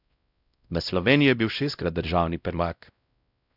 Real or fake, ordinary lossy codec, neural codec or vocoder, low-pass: fake; none; codec, 16 kHz, 0.5 kbps, X-Codec, WavLM features, trained on Multilingual LibriSpeech; 5.4 kHz